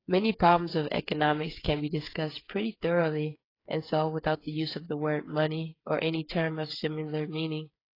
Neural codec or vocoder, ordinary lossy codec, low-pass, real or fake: codec, 16 kHz, 8 kbps, FreqCodec, smaller model; AAC, 24 kbps; 5.4 kHz; fake